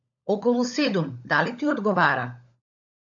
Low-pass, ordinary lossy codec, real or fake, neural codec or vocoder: 7.2 kHz; AAC, 64 kbps; fake; codec, 16 kHz, 16 kbps, FunCodec, trained on LibriTTS, 50 frames a second